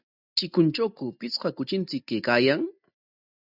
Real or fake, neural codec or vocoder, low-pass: real; none; 5.4 kHz